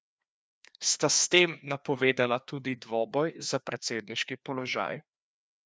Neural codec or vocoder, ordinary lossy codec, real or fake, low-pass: codec, 16 kHz, 2 kbps, FreqCodec, larger model; none; fake; none